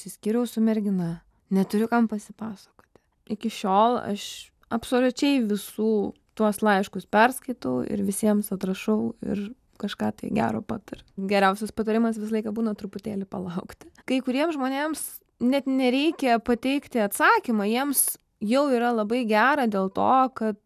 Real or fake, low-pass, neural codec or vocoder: real; 14.4 kHz; none